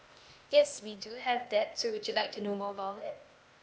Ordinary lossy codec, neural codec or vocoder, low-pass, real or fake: none; codec, 16 kHz, 0.8 kbps, ZipCodec; none; fake